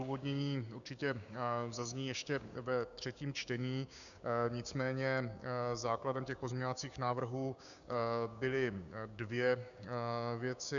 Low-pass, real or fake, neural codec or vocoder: 7.2 kHz; fake; codec, 16 kHz, 6 kbps, DAC